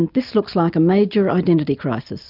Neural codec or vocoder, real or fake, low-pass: none; real; 5.4 kHz